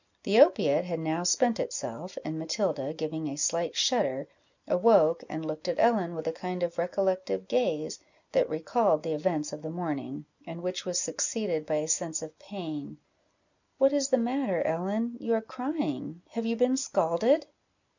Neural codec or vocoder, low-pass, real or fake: none; 7.2 kHz; real